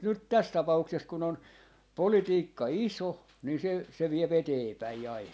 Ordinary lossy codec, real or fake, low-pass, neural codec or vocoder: none; real; none; none